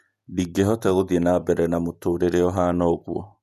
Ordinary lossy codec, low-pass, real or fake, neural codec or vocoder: none; 14.4 kHz; fake; vocoder, 48 kHz, 128 mel bands, Vocos